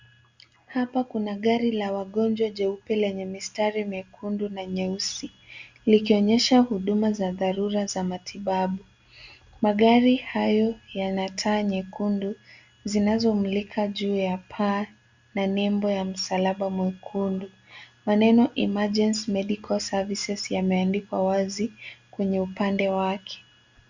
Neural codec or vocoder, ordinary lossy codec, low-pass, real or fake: none; Opus, 64 kbps; 7.2 kHz; real